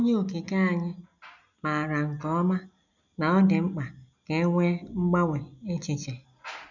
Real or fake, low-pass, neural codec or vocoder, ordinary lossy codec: real; 7.2 kHz; none; none